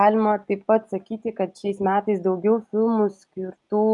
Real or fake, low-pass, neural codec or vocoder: real; 10.8 kHz; none